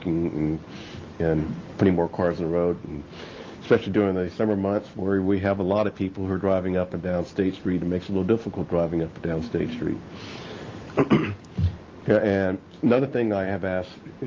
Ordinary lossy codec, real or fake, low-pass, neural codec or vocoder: Opus, 32 kbps; real; 7.2 kHz; none